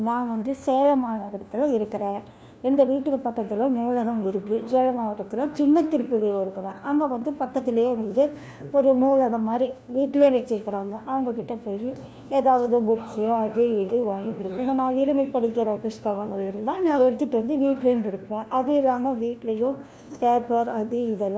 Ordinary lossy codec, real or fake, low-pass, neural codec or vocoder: none; fake; none; codec, 16 kHz, 1 kbps, FunCodec, trained on LibriTTS, 50 frames a second